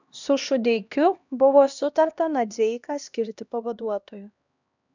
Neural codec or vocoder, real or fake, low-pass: codec, 16 kHz, 1 kbps, X-Codec, HuBERT features, trained on LibriSpeech; fake; 7.2 kHz